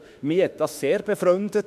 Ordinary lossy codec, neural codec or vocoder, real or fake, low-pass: none; autoencoder, 48 kHz, 32 numbers a frame, DAC-VAE, trained on Japanese speech; fake; 14.4 kHz